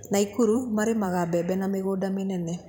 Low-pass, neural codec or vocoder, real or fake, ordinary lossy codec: 19.8 kHz; vocoder, 44.1 kHz, 128 mel bands every 512 samples, BigVGAN v2; fake; none